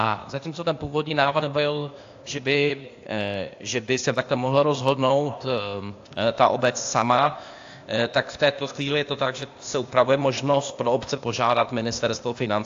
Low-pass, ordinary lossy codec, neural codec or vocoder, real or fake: 7.2 kHz; AAC, 48 kbps; codec, 16 kHz, 0.8 kbps, ZipCodec; fake